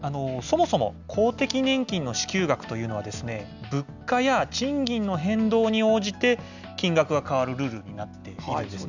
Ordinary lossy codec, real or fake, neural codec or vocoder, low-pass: none; real; none; 7.2 kHz